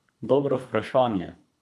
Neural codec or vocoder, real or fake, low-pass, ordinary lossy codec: codec, 24 kHz, 3 kbps, HILCodec; fake; none; none